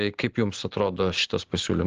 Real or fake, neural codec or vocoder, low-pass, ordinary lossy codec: real; none; 7.2 kHz; Opus, 16 kbps